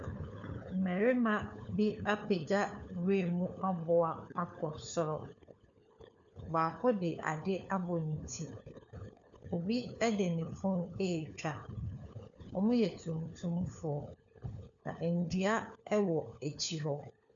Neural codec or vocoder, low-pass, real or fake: codec, 16 kHz, 4 kbps, FunCodec, trained on LibriTTS, 50 frames a second; 7.2 kHz; fake